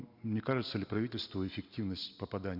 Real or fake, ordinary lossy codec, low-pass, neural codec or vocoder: real; Opus, 64 kbps; 5.4 kHz; none